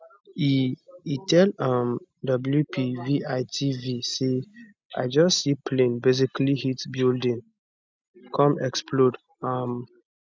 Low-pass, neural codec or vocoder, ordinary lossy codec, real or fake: none; none; none; real